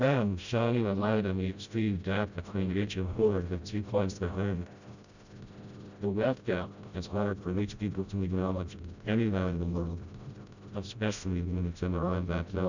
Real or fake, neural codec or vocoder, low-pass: fake; codec, 16 kHz, 0.5 kbps, FreqCodec, smaller model; 7.2 kHz